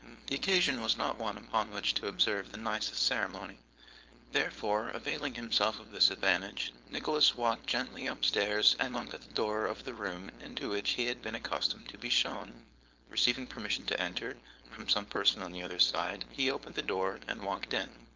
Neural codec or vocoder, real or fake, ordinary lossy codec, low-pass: codec, 16 kHz, 4.8 kbps, FACodec; fake; Opus, 24 kbps; 7.2 kHz